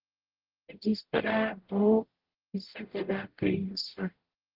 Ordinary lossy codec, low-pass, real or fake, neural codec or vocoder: Opus, 16 kbps; 5.4 kHz; fake; codec, 44.1 kHz, 0.9 kbps, DAC